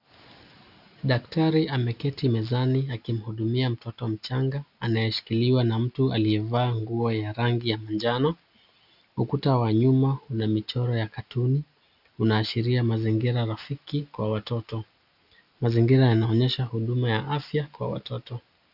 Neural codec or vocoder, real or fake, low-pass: none; real; 5.4 kHz